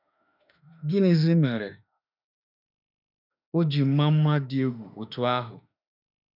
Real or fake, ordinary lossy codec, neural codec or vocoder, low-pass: fake; none; autoencoder, 48 kHz, 32 numbers a frame, DAC-VAE, trained on Japanese speech; 5.4 kHz